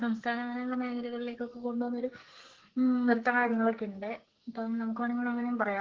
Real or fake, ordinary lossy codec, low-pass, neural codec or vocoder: fake; Opus, 16 kbps; 7.2 kHz; codec, 44.1 kHz, 2.6 kbps, SNAC